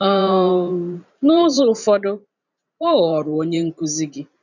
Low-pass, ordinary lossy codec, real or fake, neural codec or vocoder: 7.2 kHz; none; fake; vocoder, 44.1 kHz, 128 mel bands every 512 samples, BigVGAN v2